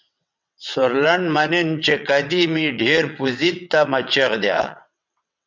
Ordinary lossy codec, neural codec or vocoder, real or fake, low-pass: MP3, 64 kbps; vocoder, 22.05 kHz, 80 mel bands, WaveNeXt; fake; 7.2 kHz